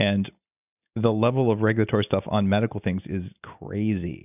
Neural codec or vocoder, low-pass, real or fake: none; 3.6 kHz; real